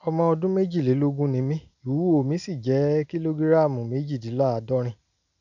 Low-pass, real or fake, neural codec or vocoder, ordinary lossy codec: 7.2 kHz; real; none; none